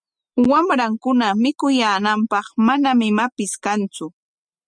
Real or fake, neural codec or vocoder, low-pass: real; none; 9.9 kHz